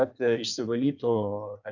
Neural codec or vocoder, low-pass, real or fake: codec, 16 kHz in and 24 kHz out, 1.1 kbps, FireRedTTS-2 codec; 7.2 kHz; fake